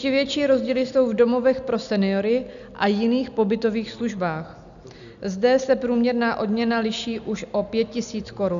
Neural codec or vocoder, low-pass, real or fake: none; 7.2 kHz; real